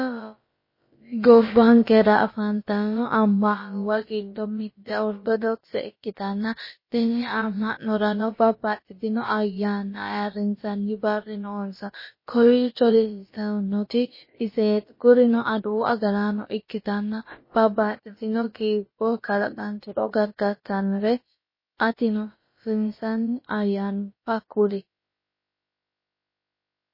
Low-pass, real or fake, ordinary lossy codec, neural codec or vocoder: 5.4 kHz; fake; MP3, 24 kbps; codec, 16 kHz, about 1 kbps, DyCAST, with the encoder's durations